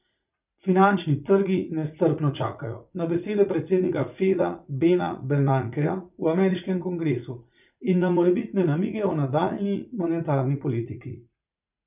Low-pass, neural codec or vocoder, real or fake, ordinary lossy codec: 3.6 kHz; vocoder, 44.1 kHz, 80 mel bands, Vocos; fake; none